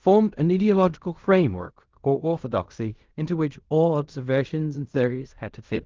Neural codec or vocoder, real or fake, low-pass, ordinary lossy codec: codec, 16 kHz in and 24 kHz out, 0.4 kbps, LongCat-Audio-Codec, fine tuned four codebook decoder; fake; 7.2 kHz; Opus, 32 kbps